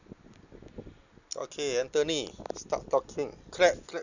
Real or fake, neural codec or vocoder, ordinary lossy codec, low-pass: real; none; none; 7.2 kHz